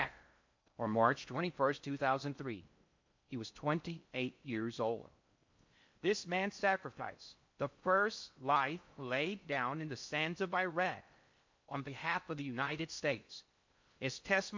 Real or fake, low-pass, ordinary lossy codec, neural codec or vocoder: fake; 7.2 kHz; MP3, 48 kbps; codec, 16 kHz in and 24 kHz out, 0.6 kbps, FocalCodec, streaming, 4096 codes